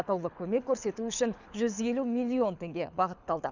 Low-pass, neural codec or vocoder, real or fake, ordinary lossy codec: 7.2 kHz; codec, 24 kHz, 6 kbps, HILCodec; fake; none